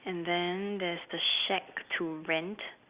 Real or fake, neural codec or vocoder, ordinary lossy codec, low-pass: real; none; Opus, 64 kbps; 3.6 kHz